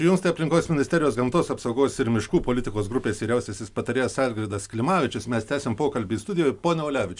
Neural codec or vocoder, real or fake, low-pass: none; real; 10.8 kHz